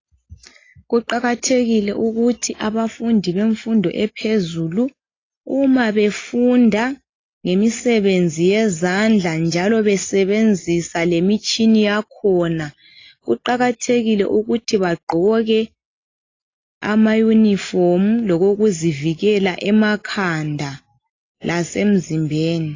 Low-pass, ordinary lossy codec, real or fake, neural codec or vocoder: 7.2 kHz; AAC, 32 kbps; real; none